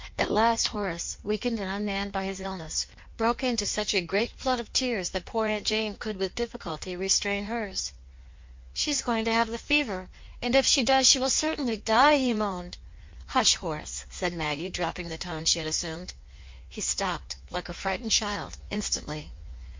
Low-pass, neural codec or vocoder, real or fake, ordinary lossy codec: 7.2 kHz; codec, 16 kHz in and 24 kHz out, 1.1 kbps, FireRedTTS-2 codec; fake; MP3, 48 kbps